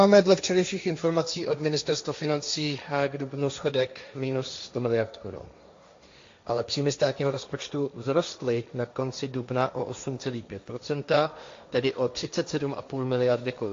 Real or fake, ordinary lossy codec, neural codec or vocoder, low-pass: fake; AAC, 48 kbps; codec, 16 kHz, 1.1 kbps, Voila-Tokenizer; 7.2 kHz